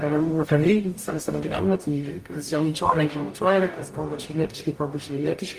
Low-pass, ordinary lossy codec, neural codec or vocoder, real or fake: 14.4 kHz; Opus, 32 kbps; codec, 44.1 kHz, 0.9 kbps, DAC; fake